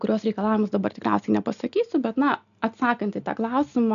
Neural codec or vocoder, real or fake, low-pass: none; real; 7.2 kHz